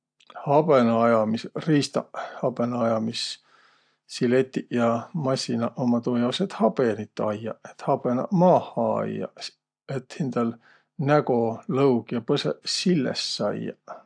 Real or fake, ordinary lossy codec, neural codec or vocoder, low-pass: real; AAC, 64 kbps; none; 9.9 kHz